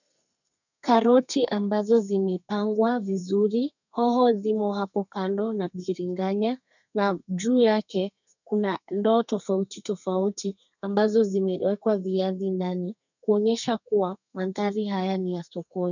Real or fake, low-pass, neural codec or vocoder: fake; 7.2 kHz; codec, 32 kHz, 1.9 kbps, SNAC